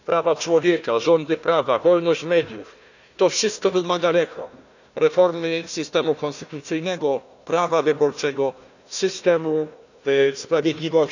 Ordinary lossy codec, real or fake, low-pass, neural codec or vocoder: none; fake; 7.2 kHz; codec, 16 kHz, 1 kbps, FunCodec, trained on Chinese and English, 50 frames a second